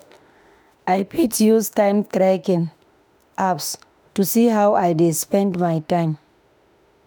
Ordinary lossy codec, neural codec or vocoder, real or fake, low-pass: none; autoencoder, 48 kHz, 32 numbers a frame, DAC-VAE, trained on Japanese speech; fake; none